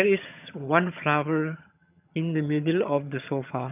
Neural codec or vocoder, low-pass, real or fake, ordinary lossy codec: vocoder, 22.05 kHz, 80 mel bands, HiFi-GAN; 3.6 kHz; fake; none